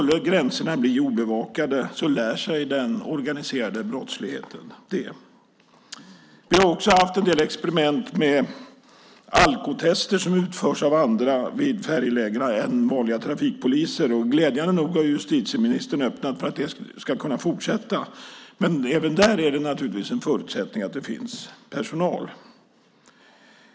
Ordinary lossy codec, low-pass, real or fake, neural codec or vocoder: none; none; real; none